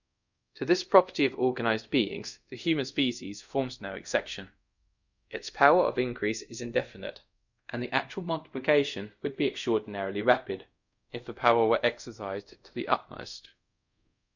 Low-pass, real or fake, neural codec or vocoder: 7.2 kHz; fake; codec, 24 kHz, 0.5 kbps, DualCodec